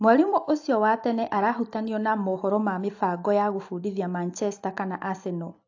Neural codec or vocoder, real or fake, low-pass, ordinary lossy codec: none; real; 7.2 kHz; AAC, 48 kbps